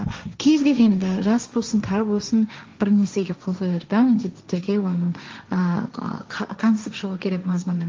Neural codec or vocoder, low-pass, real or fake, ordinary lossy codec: codec, 16 kHz, 1.1 kbps, Voila-Tokenizer; 7.2 kHz; fake; Opus, 32 kbps